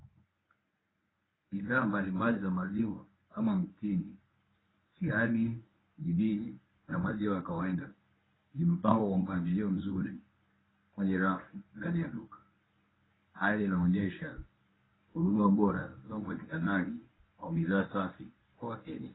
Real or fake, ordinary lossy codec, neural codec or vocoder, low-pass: fake; AAC, 16 kbps; codec, 24 kHz, 0.9 kbps, WavTokenizer, medium speech release version 1; 7.2 kHz